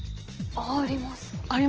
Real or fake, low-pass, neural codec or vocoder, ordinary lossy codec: real; 7.2 kHz; none; Opus, 16 kbps